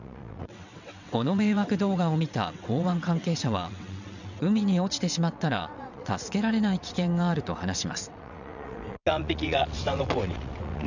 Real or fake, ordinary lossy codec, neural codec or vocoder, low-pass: fake; none; vocoder, 22.05 kHz, 80 mel bands, WaveNeXt; 7.2 kHz